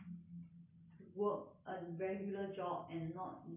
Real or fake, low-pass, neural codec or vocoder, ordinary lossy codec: real; 3.6 kHz; none; none